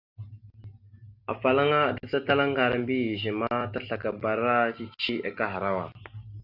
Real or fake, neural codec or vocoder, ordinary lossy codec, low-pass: real; none; Opus, 64 kbps; 5.4 kHz